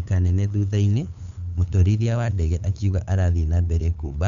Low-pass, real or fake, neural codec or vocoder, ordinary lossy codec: 7.2 kHz; fake; codec, 16 kHz, 8 kbps, FunCodec, trained on LibriTTS, 25 frames a second; none